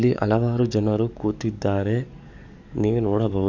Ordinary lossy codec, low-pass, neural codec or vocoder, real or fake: none; 7.2 kHz; codec, 44.1 kHz, 7.8 kbps, DAC; fake